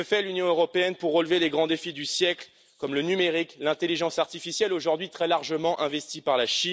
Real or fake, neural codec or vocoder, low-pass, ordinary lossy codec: real; none; none; none